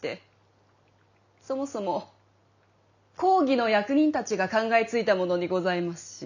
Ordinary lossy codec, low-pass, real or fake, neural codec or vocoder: none; 7.2 kHz; real; none